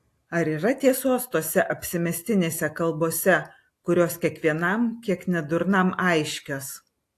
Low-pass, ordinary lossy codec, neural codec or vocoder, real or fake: 14.4 kHz; AAC, 64 kbps; none; real